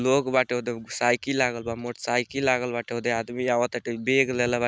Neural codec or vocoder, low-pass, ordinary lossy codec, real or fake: none; none; none; real